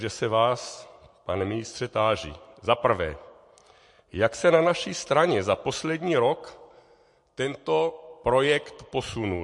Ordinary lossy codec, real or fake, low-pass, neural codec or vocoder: MP3, 48 kbps; real; 10.8 kHz; none